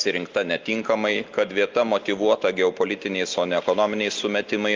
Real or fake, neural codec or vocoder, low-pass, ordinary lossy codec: real; none; 7.2 kHz; Opus, 24 kbps